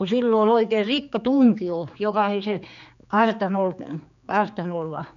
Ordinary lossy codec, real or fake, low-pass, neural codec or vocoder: none; fake; 7.2 kHz; codec, 16 kHz, 4 kbps, X-Codec, HuBERT features, trained on general audio